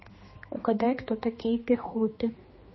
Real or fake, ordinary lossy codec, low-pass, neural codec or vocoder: fake; MP3, 24 kbps; 7.2 kHz; codec, 16 kHz, 2 kbps, X-Codec, HuBERT features, trained on general audio